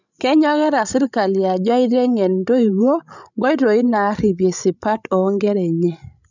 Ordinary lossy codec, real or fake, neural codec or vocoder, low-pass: none; fake; codec, 16 kHz, 16 kbps, FreqCodec, larger model; 7.2 kHz